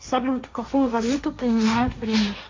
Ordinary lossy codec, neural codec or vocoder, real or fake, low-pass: MP3, 48 kbps; codec, 16 kHz, 1.1 kbps, Voila-Tokenizer; fake; 7.2 kHz